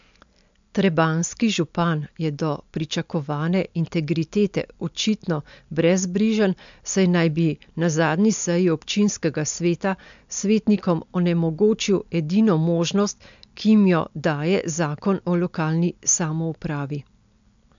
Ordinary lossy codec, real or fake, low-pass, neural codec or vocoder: MP3, 64 kbps; real; 7.2 kHz; none